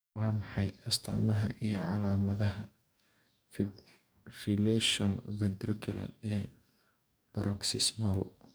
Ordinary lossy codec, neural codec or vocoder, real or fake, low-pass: none; codec, 44.1 kHz, 2.6 kbps, DAC; fake; none